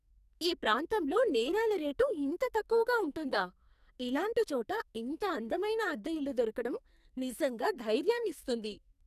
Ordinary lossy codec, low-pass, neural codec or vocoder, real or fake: none; 14.4 kHz; codec, 44.1 kHz, 2.6 kbps, SNAC; fake